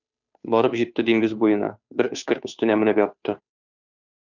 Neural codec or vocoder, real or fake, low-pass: codec, 16 kHz, 2 kbps, FunCodec, trained on Chinese and English, 25 frames a second; fake; 7.2 kHz